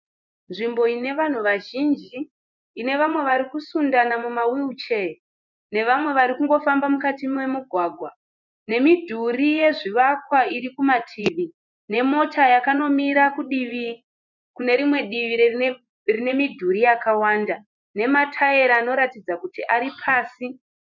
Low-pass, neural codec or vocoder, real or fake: 7.2 kHz; vocoder, 24 kHz, 100 mel bands, Vocos; fake